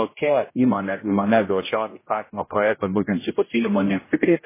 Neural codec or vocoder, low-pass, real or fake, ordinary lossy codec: codec, 16 kHz, 0.5 kbps, X-Codec, HuBERT features, trained on general audio; 3.6 kHz; fake; MP3, 16 kbps